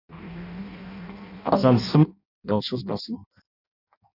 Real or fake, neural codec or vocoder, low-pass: fake; codec, 16 kHz in and 24 kHz out, 0.6 kbps, FireRedTTS-2 codec; 5.4 kHz